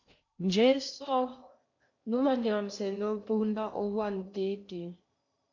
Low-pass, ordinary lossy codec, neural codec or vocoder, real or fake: 7.2 kHz; MP3, 48 kbps; codec, 16 kHz in and 24 kHz out, 0.8 kbps, FocalCodec, streaming, 65536 codes; fake